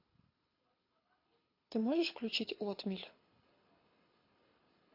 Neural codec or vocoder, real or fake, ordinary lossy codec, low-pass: codec, 24 kHz, 6 kbps, HILCodec; fake; MP3, 32 kbps; 5.4 kHz